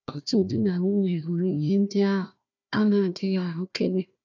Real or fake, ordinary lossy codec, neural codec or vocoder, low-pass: fake; none; codec, 16 kHz, 1 kbps, FunCodec, trained on Chinese and English, 50 frames a second; 7.2 kHz